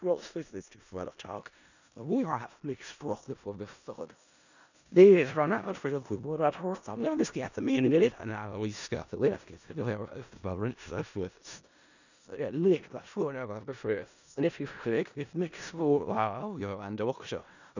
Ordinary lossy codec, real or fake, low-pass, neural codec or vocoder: none; fake; 7.2 kHz; codec, 16 kHz in and 24 kHz out, 0.4 kbps, LongCat-Audio-Codec, four codebook decoder